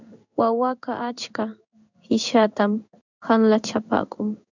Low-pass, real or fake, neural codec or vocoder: 7.2 kHz; fake; codec, 16 kHz in and 24 kHz out, 1 kbps, XY-Tokenizer